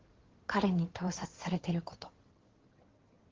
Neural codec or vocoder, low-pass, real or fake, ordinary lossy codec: codec, 16 kHz in and 24 kHz out, 2.2 kbps, FireRedTTS-2 codec; 7.2 kHz; fake; Opus, 16 kbps